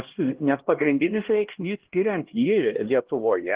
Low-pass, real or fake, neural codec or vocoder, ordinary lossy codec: 3.6 kHz; fake; codec, 16 kHz, 1 kbps, X-Codec, HuBERT features, trained on LibriSpeech; Opus, 16 kbps